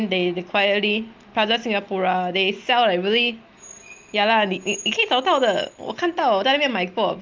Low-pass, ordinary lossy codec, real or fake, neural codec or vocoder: 7.2 kHz; Opus, 24 kbps; real; none